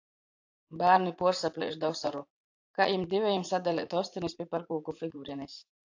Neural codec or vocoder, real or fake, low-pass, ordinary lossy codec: vocoder, 44.1 kHz, 80 mel bands, Vocos; fake; 7.2 kHz; AAC, 48 kbps